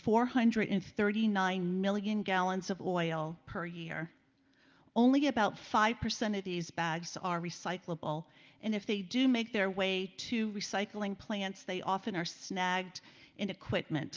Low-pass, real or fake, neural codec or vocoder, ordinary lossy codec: 7.2 kHz; real; none; Opus, 32 kbps